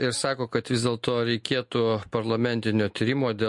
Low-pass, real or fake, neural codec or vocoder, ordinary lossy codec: 10.8 kHz; real; none; MP3, 48 kbps